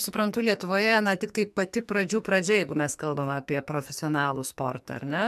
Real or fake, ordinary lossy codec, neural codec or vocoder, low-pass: fake; MP3, 96 kbps; codec, 44.1 kHz, 2.6 kbps, SNAC; 14.4 kHz